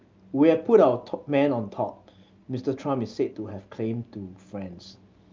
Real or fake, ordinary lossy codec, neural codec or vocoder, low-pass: real; Opus, 24 kbps; none; 7.2 kHz